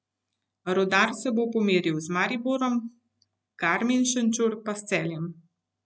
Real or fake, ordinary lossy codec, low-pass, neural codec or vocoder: real; none; none; none